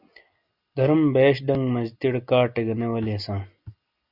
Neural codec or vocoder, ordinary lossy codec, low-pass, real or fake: none; Opus, 64 kbps; 5.4 kHz; real